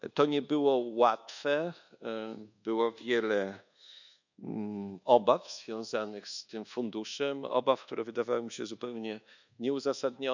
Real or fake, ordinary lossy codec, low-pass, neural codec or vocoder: fake; none; 7.2 kHz; codec, 24 kHz, 1.2 kbps, DualCodec